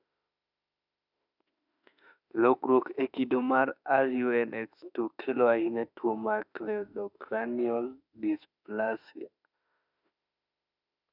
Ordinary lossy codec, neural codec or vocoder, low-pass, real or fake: none; autoencoder, 48 kHz, 32 numbers a frame, DAC-VAE, trained on Japanese speech; 5.4 kHz; fake